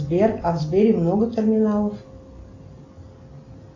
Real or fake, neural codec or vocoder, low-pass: real; none; 7.2 kHz